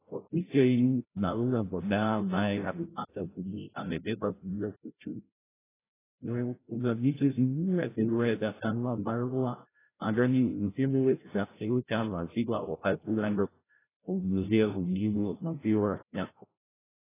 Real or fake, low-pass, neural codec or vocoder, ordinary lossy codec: fake; 3.6 kHz; codec, 16 kHz, 0.5 kbps, FreqCodec, larger model; AAC, 16 kbps